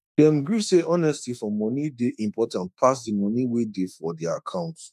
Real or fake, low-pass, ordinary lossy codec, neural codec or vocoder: fake; 14.4 kHz; none; autoencoder, 48 kHz, 32 numbers a frame, DAC-VAE, trained on Japanese speech